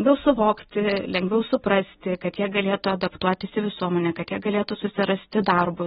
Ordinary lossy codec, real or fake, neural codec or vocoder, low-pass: AAC, 16 kbps; real; none; 14.4 kHz